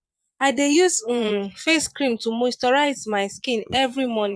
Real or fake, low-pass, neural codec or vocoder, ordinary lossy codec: fake; none; vocoder, 22.05 kHz, 80 mel bands, Vocos; none